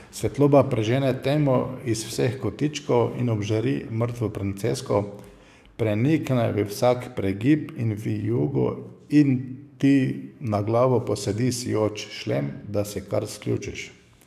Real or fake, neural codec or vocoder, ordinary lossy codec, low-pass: fake; codec, 44.1 kHz, 7.8 kbps, DAC; none; 14.4 kHz